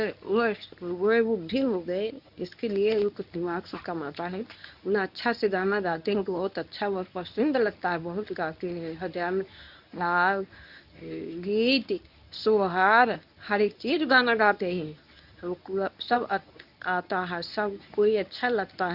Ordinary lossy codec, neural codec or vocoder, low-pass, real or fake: none; codec, 24 kHz, 0.9 kbps, WavTokenizer, medium speech release version 1; 5.4 kHz; fake